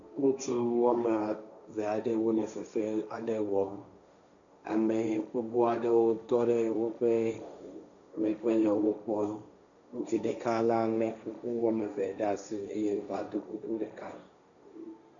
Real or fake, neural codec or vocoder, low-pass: fake; codec, 16 kHz, 1.1 kbps, Voila-Tokenizer; 7.2 kHz